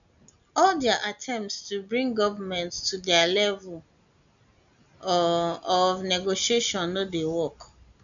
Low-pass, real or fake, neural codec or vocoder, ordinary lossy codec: 7.2 kHz; real; none; none